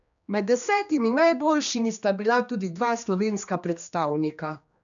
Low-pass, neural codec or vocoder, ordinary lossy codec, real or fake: 7.2 kHz; codec, 16 kHz, 2 kbps, X-Codec, HuBERT features, trained on general audio; none; fake